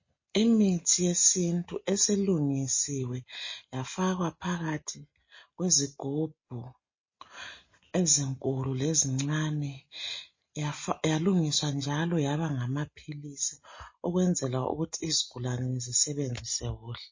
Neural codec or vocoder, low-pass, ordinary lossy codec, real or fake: none; 7.2 kHz; MP3, 32 kbps; real